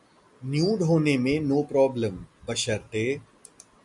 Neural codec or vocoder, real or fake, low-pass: none; real; 10.8 kHz